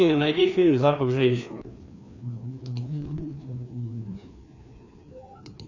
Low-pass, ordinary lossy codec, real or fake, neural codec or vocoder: 7.2 kHz; AAC, 48 kbps; fake; codec, 16 kHz, 2 kbps, FreqCodec, larger model